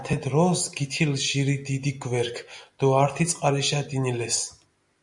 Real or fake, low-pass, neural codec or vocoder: real; 10.8 kHz; none